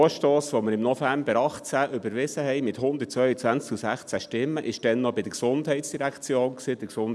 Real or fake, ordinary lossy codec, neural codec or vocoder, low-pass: real; none; none; none